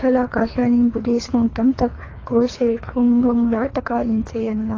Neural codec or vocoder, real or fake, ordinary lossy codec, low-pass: codec, 24 kHz, 3 kbps, HILCodec; fake; AAC, 32 kbps; 7.2 kHz